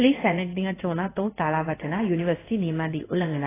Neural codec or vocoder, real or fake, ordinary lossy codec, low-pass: codec, 16 kHz in and 24 kHz out, 1 kbps, XY-Tokenizer; fake; AAC, 16 kbps; 3.6 kHz